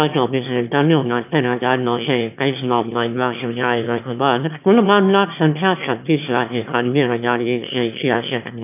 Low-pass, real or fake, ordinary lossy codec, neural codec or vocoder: 3.6 kHz; fake; none; autoencoder, 22.05 kHz, a latent of 192 numbers a frame, VITS, trained on one speaker